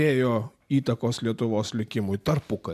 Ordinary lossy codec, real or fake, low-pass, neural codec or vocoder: MP3, 96 kbps; real; 14.4 kHz; none